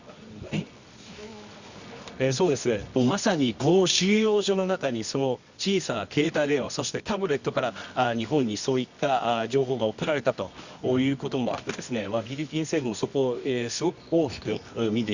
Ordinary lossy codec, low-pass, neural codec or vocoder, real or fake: Opus, 64 kbps; 7.2 kHz; codec, 24 kHz, 0.9 kbps, WavTokenizer, medium music audio release; fake